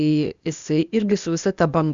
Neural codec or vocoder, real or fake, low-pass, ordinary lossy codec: codec, 16 kHz, 0.8 kbps, ZipCodec; fake; 7.2 kHz; Opus, 64 kbps